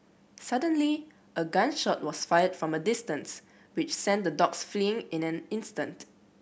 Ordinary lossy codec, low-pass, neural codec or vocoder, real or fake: none; none; none; real